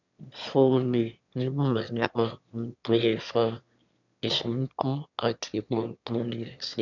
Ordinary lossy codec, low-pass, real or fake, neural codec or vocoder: none; 7.2 kHz; fake; autoencoder, 22.05 kHz, a latent of 192 numbers a frame, VITS, trained on one speaker